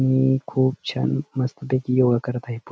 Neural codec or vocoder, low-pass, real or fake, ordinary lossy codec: none; none; real; none